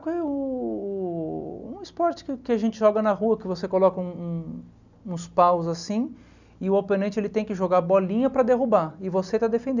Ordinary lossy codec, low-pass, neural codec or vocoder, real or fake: none; 7.2 kHz; none; real